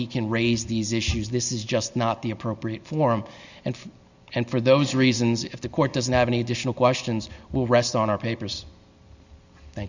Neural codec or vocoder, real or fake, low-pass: none; real; 7.2 kHz